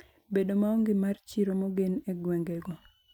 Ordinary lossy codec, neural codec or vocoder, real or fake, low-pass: none; vocoder, 44.1 kHz, 128 mel bands every 256 samples, BigVGAN v2; fake; 19.8 kHz